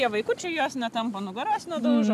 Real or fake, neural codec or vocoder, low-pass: real; none; 14.4 kHz